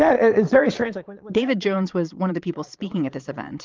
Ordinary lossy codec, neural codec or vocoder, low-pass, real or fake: Opus, 24 kbps; vocoder, 22.05 kHz, 80 mel bands, Vocos; 7.2 kHz; fake